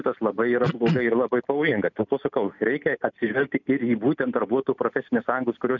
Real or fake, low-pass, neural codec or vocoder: real; 7.2 kHz; none